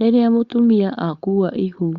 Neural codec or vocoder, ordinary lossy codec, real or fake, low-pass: codec, 16 kHz, 4.8 kbps, FACodec; Opus, 64 kbps; fake; 7.2 kHz